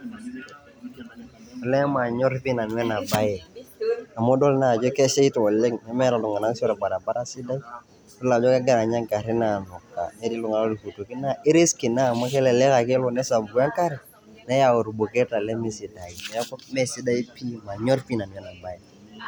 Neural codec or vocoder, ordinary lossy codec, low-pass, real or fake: none; none; none; real